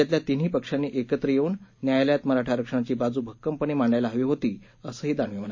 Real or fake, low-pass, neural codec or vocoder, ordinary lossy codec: real; 7.2 kHz; none; MP3, 32 kbps